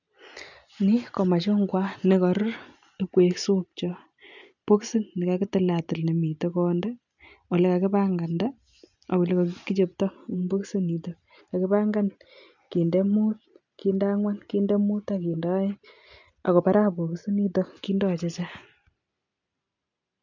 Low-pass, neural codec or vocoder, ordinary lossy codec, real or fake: 7.2 kHz; none; none; real